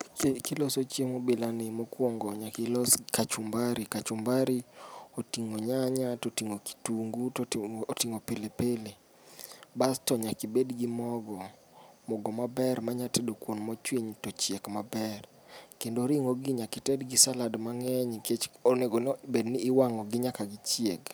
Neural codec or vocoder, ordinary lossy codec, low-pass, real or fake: none; none; none; real